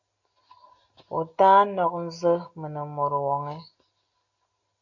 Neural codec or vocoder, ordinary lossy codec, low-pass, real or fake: none; AAC, 48 kbps; 7.2 kHz; real